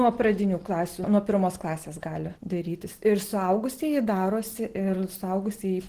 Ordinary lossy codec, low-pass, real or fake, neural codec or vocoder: Opus, 16 kbps; 14.4 kHz; real; none